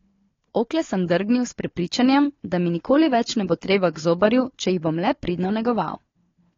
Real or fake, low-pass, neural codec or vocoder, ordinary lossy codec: fake; 7.2 kHz; codec, 16 kHz, 4 kbps, FunCodec, trained on Chinese and English, 50 frames a second; AAC, 32 kbps